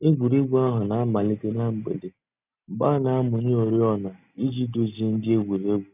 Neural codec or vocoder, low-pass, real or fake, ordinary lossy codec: none; 3.6 kHz; real; none